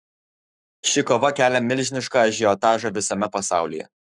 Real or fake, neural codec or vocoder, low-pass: fake; codec, 44.1 kHz, 7.8 kbps, Pupu-Codec; 10.8 kHz